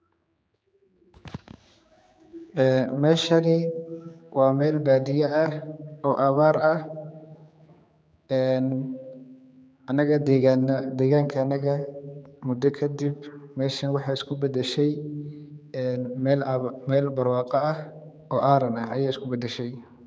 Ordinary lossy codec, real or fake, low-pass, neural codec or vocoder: none; fake; none; codec, 16 kHz, 4 kbps, X-Codec, HuBERT features, trained on general audio